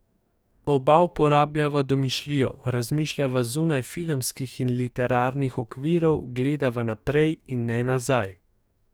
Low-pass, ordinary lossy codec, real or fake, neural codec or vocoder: none; none; fake; codec, 44.1 kHz, 2.6 kbps, DAC